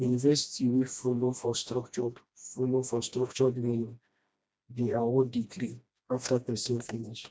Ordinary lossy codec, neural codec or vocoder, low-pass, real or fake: none; codec, 16 kHz, 1 kbps, FreqCodec, smaller model; none; fake